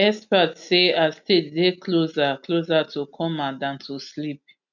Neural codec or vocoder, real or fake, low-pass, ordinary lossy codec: none; real; 7.2 kHz; none